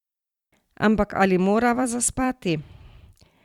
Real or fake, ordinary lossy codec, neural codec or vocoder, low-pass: fake; Opus, 64 kbps; vocoder, 44.1 kHz, 128 mel bands every 256 samples, BigVGAN v2; 19.8 kHz